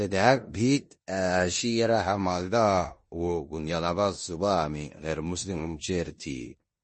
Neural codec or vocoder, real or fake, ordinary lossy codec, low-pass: codec, 16 kHz in and 24 kHz out, 0.9 kbps, LongCat-Audio-Codec, four codebook decoder; fake; MP3, 32 kbps; 10.8 kHz